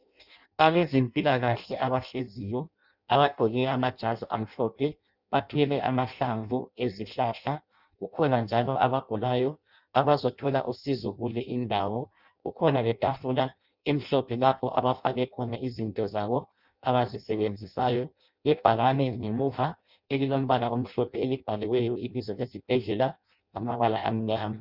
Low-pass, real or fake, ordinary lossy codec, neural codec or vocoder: 5.4 kHz; fake; Opus, 64 kbps; codec, 16 kHz in and 24 kHz out, 0.6 kbps, FireRedTTS-2 codec